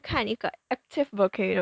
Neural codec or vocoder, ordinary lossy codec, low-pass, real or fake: none; none; none; real